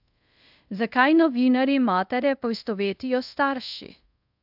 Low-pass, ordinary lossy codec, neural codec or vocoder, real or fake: 5.4 kHz; AAC, 48 kbps; codec, 24 kHz, 0.5 kbps, DualCodec; fake